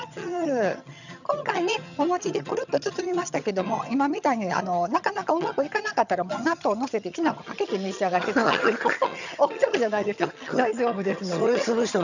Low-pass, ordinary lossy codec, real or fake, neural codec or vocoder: 7.2 kHz; none; fake; vocoder, 22.05 kHz, 80 mel bands, HiFi-GAN